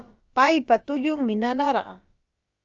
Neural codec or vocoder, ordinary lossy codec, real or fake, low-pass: codec, 16 kHz, about 1 kbps, DyCAST, with the encoder's durations; Opus, 32 kbps; fake; 7.2 kHz